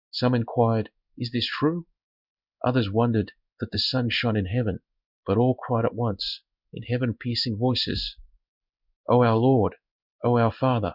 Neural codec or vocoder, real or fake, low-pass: codec, 16 kHz in and 24 kHz out, 1 kbps, XY-Tokenizer; fake; 5.4 kHz